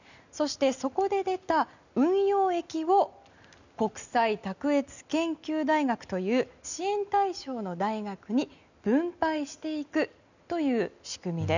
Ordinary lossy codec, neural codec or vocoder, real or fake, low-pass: none; none; real; 7.2 kHz